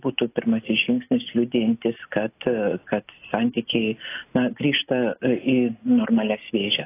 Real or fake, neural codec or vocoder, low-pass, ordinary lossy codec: real; none; 3.6 kHz; AAC, 24 kbps